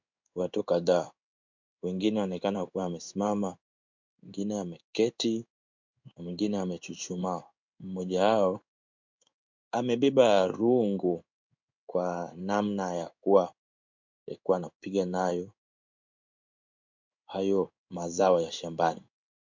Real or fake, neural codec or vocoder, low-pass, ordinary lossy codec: fake; codec, 16 kHz in and 24 kHz out, 1 kbps, XY-Tokenizer; 7.2 kHz; MP3, 64 kbps